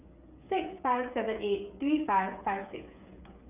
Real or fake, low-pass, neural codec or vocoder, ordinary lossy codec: fake; 3.6 kHz; codec, 16 kHz, 8 kbps, FreqCodec, smaller model; none